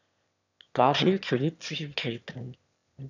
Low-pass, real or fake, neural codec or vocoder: 7.2 kHz; fake; autoencoder, 22.05 kHz, a latent of 192 numbers a frame, VITS, trained on one speaker